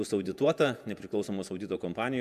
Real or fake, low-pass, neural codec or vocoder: fake; 14.4 kHz; vocoder, 48 kHz, 128 mel bands, Vocos